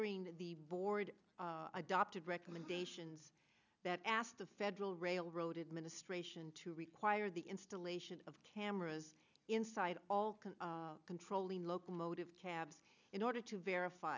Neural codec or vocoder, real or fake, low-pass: none; real; 7.2 kHz